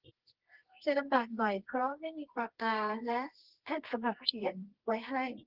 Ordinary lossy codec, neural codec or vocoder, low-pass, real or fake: Opus, 16 kbps; codec, 24 kHz, 0.9 kbps, WavTokenizer, medium music audio release; 5.4 kHz; fake